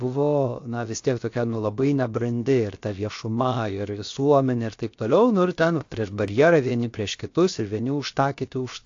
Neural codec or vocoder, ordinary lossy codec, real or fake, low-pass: codec, 16 kHz, 0.7 kbps, FocalCodec; AAC, 48 kbps; fake; 7.2 kHz